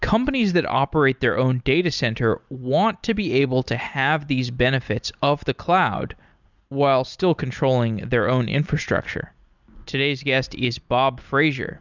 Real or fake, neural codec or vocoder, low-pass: real; none; 7.2 kHz